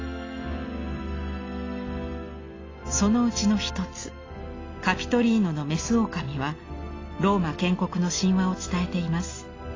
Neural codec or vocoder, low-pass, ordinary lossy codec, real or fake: none; 7.2 kHz; AAC, 32 kbps; real